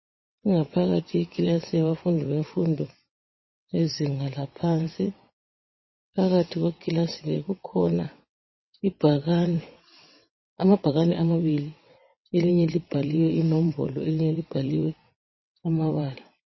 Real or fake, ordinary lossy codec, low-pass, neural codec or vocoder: fake; MP3, 24 kbps; 7.2 kHz; vocoder, 22.05 kHz, 80 mel bands, WaveNeXt